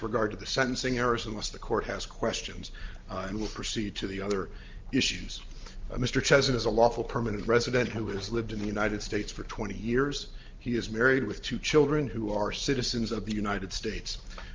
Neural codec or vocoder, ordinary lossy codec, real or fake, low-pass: none; Opus, 24 kbps; real; 7.2 kHz